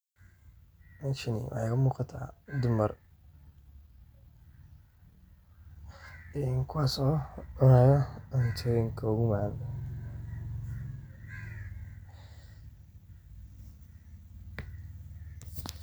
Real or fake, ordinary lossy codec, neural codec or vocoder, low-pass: real; none; none; none